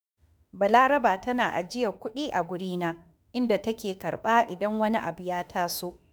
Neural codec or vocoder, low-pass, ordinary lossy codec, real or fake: autoencoder, 48 kHz, 32 numbers a frame, DAC-VAE, trained on Japanese speech; none; none; fake